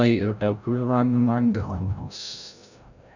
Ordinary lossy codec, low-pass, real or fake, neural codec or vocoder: none; 7.2 kHz; fake; codec, 16 kHz, 0.5 kbps, FreqCodec, larger model